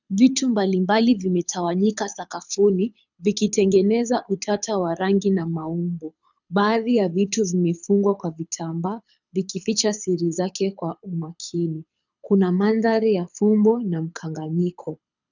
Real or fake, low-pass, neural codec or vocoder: fake; 7.2 kHz; codec, 24 kHz, 6 kbps, HILCodec